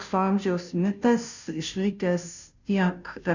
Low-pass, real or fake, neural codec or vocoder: 7.2 kHz; fake; codec, 16 kHz, 0.5 kbps, FunCodec, trained on Chinese and English, 25 frames a second